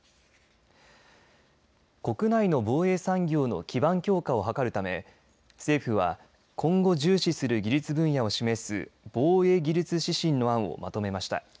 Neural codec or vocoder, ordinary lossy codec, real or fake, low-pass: none; none; real; none